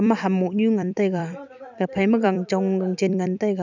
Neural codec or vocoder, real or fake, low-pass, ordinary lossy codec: vocoder, 44.1 kHz, 128 mel bands every 256 samples, BigVGAN v2; fake; 7.2 kHz; none